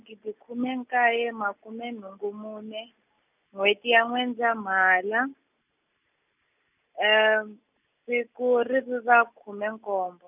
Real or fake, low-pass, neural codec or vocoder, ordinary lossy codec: real; 3.6 kHz; none; none